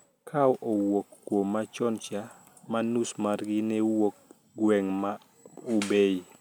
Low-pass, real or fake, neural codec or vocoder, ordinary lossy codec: none; real; none; none